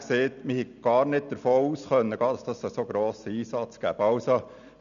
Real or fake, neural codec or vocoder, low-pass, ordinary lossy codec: real; none; 7.2 kHz; none